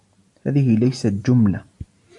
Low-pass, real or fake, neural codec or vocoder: 10.8 kHz; real; none